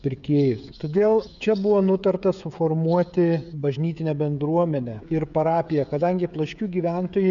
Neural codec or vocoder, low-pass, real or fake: codec, 16 kHz, 16 kbps, FreqCodec, smaller model; 7.2 kHz; fake